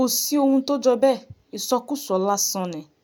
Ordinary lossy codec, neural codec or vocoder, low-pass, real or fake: none; none; none; real